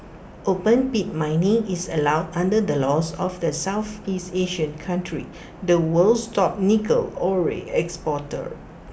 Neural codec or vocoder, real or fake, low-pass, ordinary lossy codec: none; real; none; none